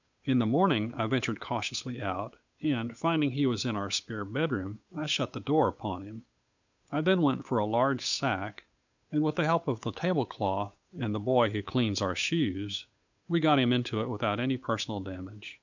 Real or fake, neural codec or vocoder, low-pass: fake; codec, 16 kHz, 8 kbps, FunCodec, trained on Chinese and English, 25 frames a second; 7.2 kHz